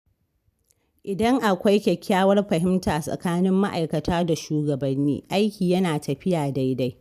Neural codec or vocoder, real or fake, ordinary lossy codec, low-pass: none; real; none; 14.4 kHz